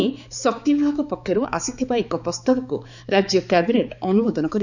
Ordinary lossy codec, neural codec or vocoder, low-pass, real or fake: none; codec, 16 kHz, 4 kbps, X-Codec, HuBERT features, trained on balanced general audio; 7.2 kHz; fake